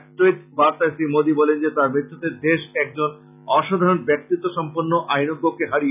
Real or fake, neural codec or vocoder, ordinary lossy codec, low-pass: real; none; none; 3.6 kHz